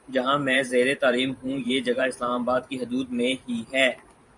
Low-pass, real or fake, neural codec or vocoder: 10.8 kHz; fake; vocoder, 44.1 kHz, 128 mel bands every 512 samples, BigVGAN v2